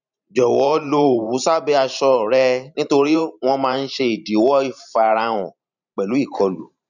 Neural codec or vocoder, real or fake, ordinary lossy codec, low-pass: vocoder, 44.1 kHz, 128 mel bands every 512 samples, BigVGAN v2; fake; none; 7.2 kHz